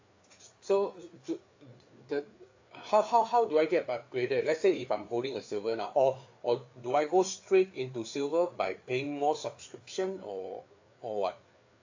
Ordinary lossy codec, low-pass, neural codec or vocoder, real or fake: AAC, 48 kbps; 7.2 kHz; codec, 16 kHz, 4 kbps, FreqCodec, larger model; fake